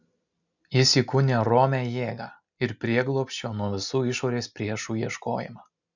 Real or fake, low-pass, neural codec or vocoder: real; 7.2 kHz; none